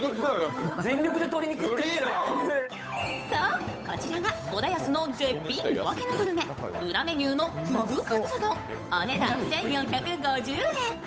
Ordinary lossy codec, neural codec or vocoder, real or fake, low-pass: none; codec, 16 kHz, 8 kbps, FunCodec, trained on Chinese and English, 25 frames a second; fake; none